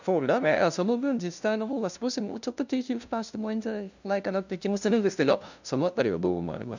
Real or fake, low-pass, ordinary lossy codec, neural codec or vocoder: fake; 7.2 kHz; none; codec, 16 kHz, 0.5 kbps, FunCodec, trained on LibriTTS, 25 frames a second